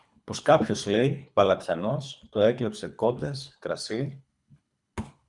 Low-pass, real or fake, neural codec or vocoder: 10.8 kHz; fake; codec, 24 kHz, 3 kbps, HILCodec